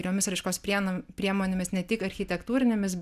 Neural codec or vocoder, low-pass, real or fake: none; 14.4 kHz; real